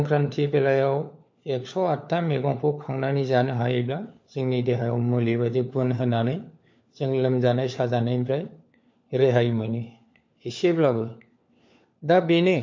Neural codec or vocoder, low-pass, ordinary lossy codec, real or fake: codec, 16 kHz, 4 kbps, FunCodec, trained on LibriTTS, 50 frames a second; 7.2 kHz; MP3, 48 kbps; fake